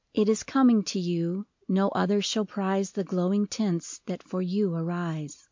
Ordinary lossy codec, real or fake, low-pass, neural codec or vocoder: MP3, 64 kbps; real; 7.2 kHz; none